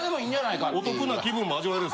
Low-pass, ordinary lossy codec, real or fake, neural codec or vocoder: none; none; real; none